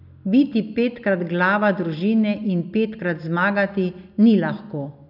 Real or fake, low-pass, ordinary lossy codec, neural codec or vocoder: real; 5.4 kHz; none; none